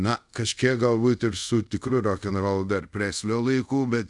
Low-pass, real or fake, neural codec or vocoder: 10.8 kHz; fake; codec, 24 kHz, 0.5 kbps, DualCodec